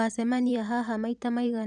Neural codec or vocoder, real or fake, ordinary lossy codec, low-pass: vocoder, 44.1 kHz, 128 mel bands every 512 samples, BigVGAN v2; fake; none; 10.8 kHz